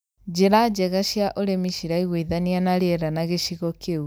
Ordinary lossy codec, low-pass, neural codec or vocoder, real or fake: none; none; none; real